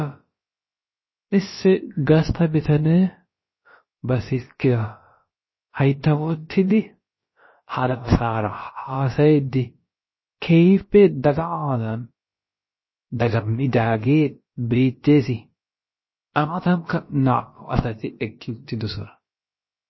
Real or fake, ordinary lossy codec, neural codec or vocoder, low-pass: fake; MP3, 24 kbps; codec, 16 kHz, about 1 kbps, DyCAST, with the encoder's durations; 7.2 kHz